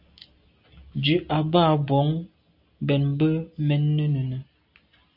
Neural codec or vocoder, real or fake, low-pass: none; real; 5.4 kHz